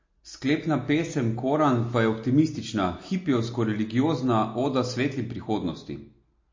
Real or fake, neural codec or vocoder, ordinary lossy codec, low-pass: real; none; MP3, 32 kbps; 7.2 kHz